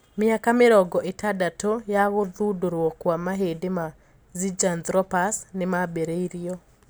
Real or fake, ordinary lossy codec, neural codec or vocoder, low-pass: real; none; none; none